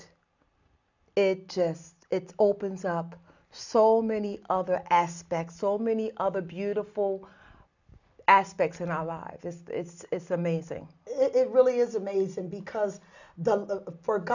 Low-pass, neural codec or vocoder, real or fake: 7.2 kHz; none; real